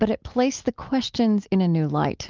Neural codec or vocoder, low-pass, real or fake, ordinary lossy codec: none; 7.2 kHz; real; Opus, 32 kbps